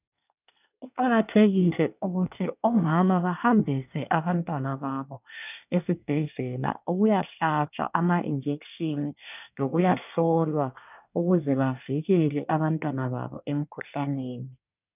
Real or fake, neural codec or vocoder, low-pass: fake; codec, 24 kHz, 1 kbps, SNAC; 3.6 kHz